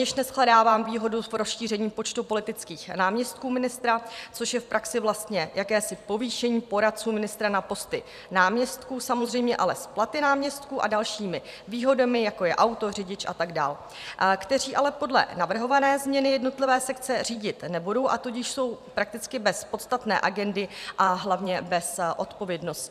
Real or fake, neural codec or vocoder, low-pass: fake; vocoder, 44.1 kHz, 128 mel bands every 512 samples, BigVGAN v2; 14.4 kHz